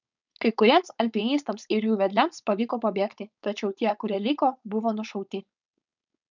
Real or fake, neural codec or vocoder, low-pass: fake; codec, 16 kHz, 4.8 kbps, FACodec; 7.2 kHz